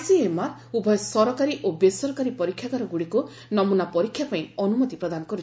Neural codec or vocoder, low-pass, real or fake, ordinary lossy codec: none; none; real; none